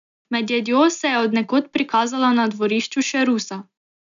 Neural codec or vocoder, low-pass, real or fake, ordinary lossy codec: none; 7.2 kHz; real; none